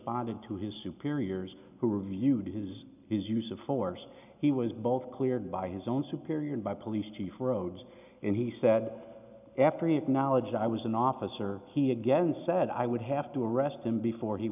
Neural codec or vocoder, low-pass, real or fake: none; 3.6 kHz; real